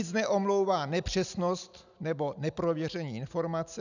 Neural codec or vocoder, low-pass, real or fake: none; 7.2 kHz; real